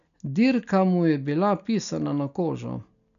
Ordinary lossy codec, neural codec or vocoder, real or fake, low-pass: none; none; real; 7.2 kHz